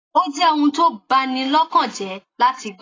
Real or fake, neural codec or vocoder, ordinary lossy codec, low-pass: real; none; AAC, 32 kbps; 7.2 kHz